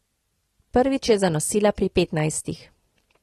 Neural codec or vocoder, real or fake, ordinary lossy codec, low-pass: none; real; AAC, 32 kbps; 19.8 kHz